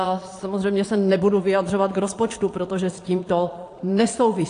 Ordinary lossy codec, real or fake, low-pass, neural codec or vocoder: AAC, 48 kbps; fake; 9.9 kHz; vocoder, 22.05 kHz, 80 mel bands, Vocos